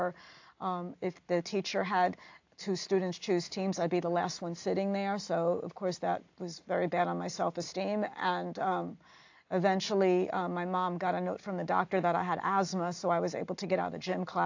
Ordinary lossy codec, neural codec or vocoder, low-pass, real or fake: AAC, 48 kbps; none; 7.2 kHz; real